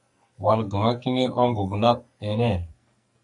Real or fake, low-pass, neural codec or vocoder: fake; 10.8 kHz; codec, 44.1 kHz, 2.6 kbps, SNAC